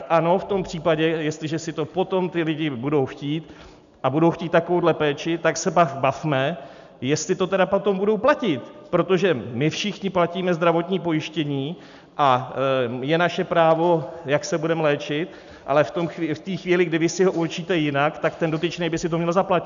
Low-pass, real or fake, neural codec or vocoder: 7.2 kHz; real; none